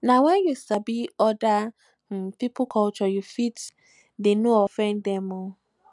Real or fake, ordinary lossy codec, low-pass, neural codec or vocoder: real; none; 10.8 kHz; none